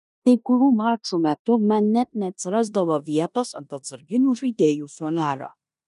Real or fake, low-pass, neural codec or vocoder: fake; 10.8 kHz; codec, 16 kHz in and 24 kHz out, 0.9 kbps, LongCat-Audio-Codec, four codebook decoder